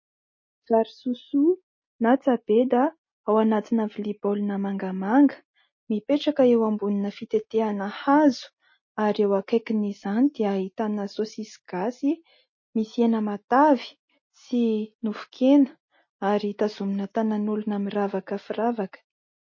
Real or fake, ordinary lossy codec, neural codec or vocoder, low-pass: real; MP3, 32 kbps; none; 7.2 kHz